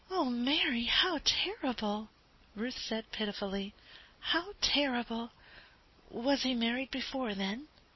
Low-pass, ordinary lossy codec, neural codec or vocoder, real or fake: 7.2 kHz; MP3, 24 kbps; none; real